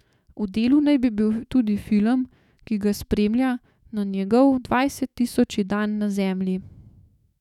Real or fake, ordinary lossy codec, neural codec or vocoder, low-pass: fake; none; autoencoder, 48 kHz, 128 numbers a frame, DAC-VAE, trained on Japanese speech; 19.8 kHz